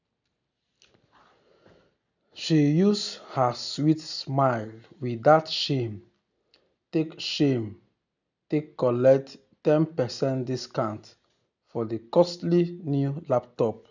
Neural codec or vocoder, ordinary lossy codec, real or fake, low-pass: none; none; real; 7.2 kHz